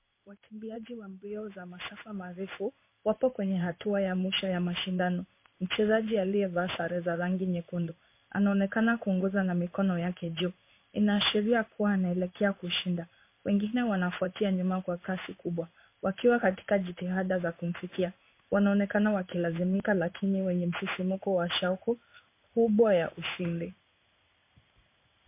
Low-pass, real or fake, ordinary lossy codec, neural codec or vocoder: 3.6 kHz; fake; MP3, 24 kbps; codec, 16 kHz in and 24 kHz out, 1 kbps, XY-Tokenizer